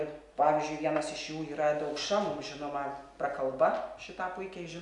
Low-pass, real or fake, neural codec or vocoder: 10.8 kHz; real; none